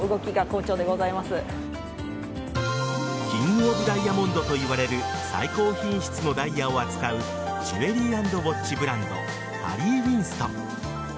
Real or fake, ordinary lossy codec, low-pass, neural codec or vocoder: real; none; none; none